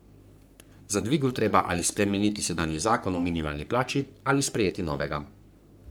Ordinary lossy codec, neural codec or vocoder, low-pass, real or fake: none; codec, 44.1 kHz, 3.4 kbps, Pupu-Codec; none; fake